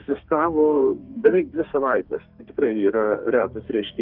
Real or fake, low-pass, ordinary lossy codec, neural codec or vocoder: fake; 5.4 kHz; Opus, 32 kbps; codec, 32 kHz, 1.9 kbps, SNAC